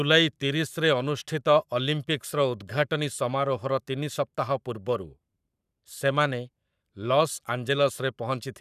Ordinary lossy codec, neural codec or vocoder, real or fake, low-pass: none; codec, 44.1 kHz, 7.8 kbps, Pupu-Codec; fake; 14.4 kHz